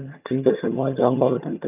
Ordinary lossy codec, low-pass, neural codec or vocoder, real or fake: none; 3.6 kHz; vocoder, 22.05 kHz, 80 mel bands, HiFi-GAN; fake